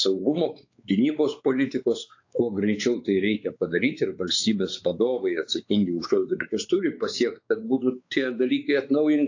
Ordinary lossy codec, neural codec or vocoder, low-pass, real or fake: AAC, 48 kbps; codec, 16 kHz, 4 kbps, X-Codec, WavLM features, trained on Multilingual LibriSpeech; 7.2 kHz; fake